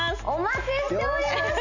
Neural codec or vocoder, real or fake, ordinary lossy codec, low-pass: none; real; none; 7.2 kHz